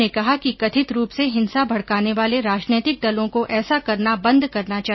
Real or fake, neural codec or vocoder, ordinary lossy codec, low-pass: real; none; MP3, 24 kbps; 7.2 kHz